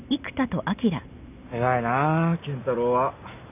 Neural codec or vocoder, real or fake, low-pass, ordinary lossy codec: none; real; 3.6 kHz; none